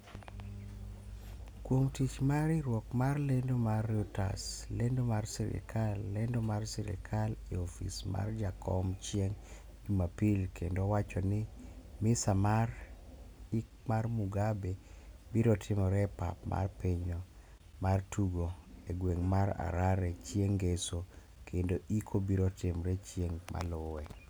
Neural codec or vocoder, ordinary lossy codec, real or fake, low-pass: none; none; real; none